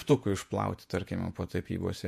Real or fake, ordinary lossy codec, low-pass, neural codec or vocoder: fake; MP3, 64 kbps; 14.4 kHz; vocoder, 48 kHz, 128 mel bands, Vocos